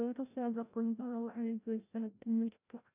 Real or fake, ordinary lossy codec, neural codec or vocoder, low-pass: fake; none; codec, 16 kHz, 0.5 kbps, FreqCodec, larger model; 3.6 kHz